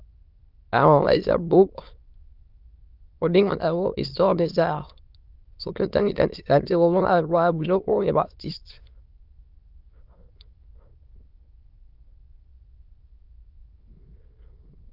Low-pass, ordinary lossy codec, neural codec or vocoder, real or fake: 5.4 kHz; Opus, 24 kbps; autoencoder, 22.05 kHz, a latent of 192 numbers a frame, VITS, trained on many speakers; fake